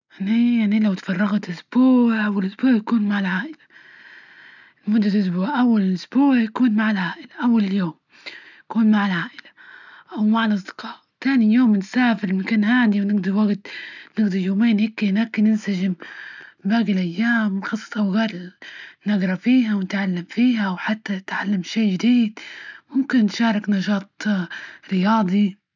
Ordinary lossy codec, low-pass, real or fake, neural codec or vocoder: none; 7.2 kHz; real; none